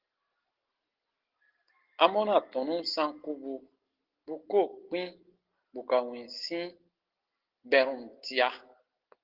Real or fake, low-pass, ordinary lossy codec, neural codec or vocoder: real; 5.4 kHz; Opus, 16 kbps; none